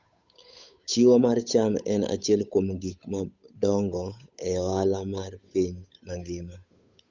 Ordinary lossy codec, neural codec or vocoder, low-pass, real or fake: Opus, 64 kbps; codec, 16 kHz, 8 kbps, FunCodec, trained on Chinese and English, 25 frames a second; 7.2 kHz; fake